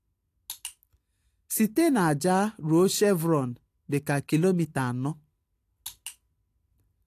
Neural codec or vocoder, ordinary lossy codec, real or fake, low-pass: none; AAC, 64 kbps; real; 14.4 kHz